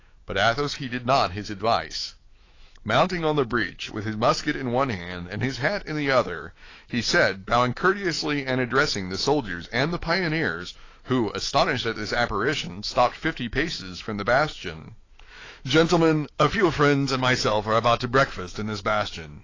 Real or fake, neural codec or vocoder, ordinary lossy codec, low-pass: fake; autoencoder, 48 kHz, 128 numbers a frame, DAC-VAE, trained on Japanese speech; AAC, 32 kbps; 7.2 kHz